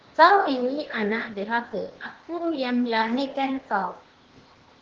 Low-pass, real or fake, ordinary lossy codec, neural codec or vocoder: 7.2 kHz; fake; Opus, 16 kbps; codec, 16 kHz, 0.8 kbps, ZipCodec